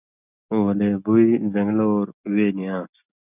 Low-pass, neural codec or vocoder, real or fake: 3.6 kHz; none; real